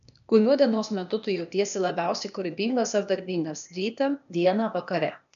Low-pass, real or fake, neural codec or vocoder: 7.2 kHz; fake; codec, 16 kHz, 0.8 kbps, ZipCodec